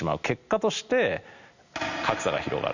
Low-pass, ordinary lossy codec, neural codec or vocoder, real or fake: 7.2 kHz; none; none; real